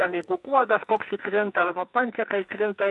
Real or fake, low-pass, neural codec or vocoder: fake; 10.8 kHz; codec, 44.1 kHz, 2.6 kbps, SNAC